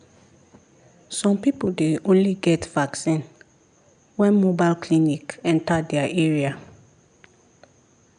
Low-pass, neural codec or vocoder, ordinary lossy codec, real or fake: 9.9 kHz; none; none; real